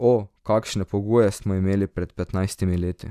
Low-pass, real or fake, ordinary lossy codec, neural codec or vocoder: 14.4 kHz; real; none; none